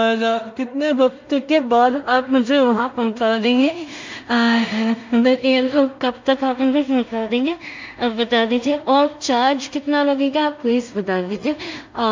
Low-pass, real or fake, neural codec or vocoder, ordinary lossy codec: 7.2 kHz; fake; codec, 16 kHz in and 24 kHz out, 0.4 kbps, LongCat-Audio-Codec, two codebook decoder; MP3, 64 kbps